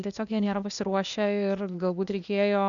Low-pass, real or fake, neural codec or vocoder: 7.2 kHz; fake; codec, 16 kHz, 0.8 kbps, ZipCodec